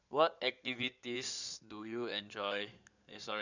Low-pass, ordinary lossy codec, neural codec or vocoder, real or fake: 7.2 kHz; none; codec, 16 kHz in and 24 kHz out, 2.2 kbps, FireRedTTS-2 codec; fake